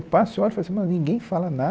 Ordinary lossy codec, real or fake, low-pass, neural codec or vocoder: none; real; none; none